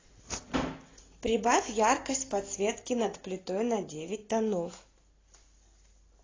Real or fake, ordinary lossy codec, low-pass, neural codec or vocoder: real; AAC, 32 kbps; 7.2 kHz; none